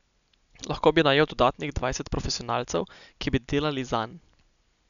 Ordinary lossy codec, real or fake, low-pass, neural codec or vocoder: none; real; 7.2 kHz; none